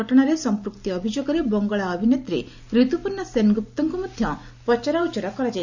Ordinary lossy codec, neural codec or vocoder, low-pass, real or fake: none; none; 7.2 kHz; real